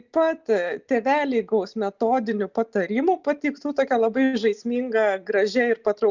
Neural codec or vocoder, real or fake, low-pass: none; real; 7.2 kHz